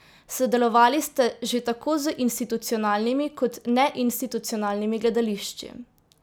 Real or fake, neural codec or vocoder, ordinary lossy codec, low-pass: real; none; none; none